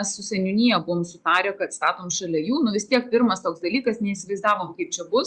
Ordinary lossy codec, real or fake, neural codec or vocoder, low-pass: Opus, 64 kbps; real; none; 10.8 kHz